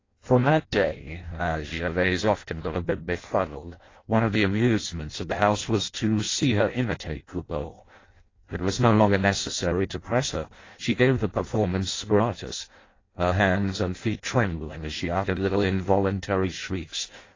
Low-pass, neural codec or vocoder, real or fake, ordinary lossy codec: 7.2 kHz; codec, 16 kHz in and 24 kHz out, 0.6 kbps, FireRedTTS-2 codec; fake; AAC, 32 kbps